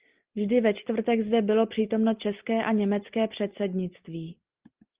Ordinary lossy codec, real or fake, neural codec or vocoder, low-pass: Opus, 32 kbps; real; none; 3.6 kHz